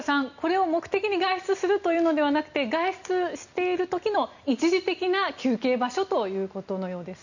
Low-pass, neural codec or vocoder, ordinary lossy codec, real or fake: 7.2 kHz; none; none; real